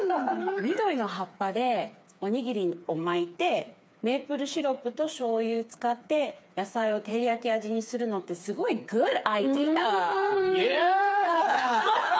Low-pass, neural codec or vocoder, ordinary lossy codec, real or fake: none; codec, 16 kHz, 4 kbps, FreqCodec, smaller model; none; fake